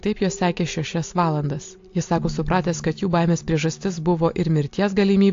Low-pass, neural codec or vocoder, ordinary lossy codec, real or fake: 7.2 kHz; none; AAC, 48 kbps; real